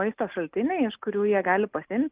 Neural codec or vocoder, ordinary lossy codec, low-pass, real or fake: none; Opus, 24 kbps; 3.6 kHz; real